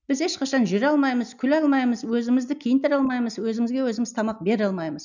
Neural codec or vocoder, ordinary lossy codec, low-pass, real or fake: none; none; 7.2 kHz; real